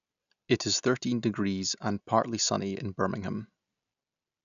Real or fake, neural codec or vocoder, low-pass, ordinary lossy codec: real; none; 7.2 kHz; none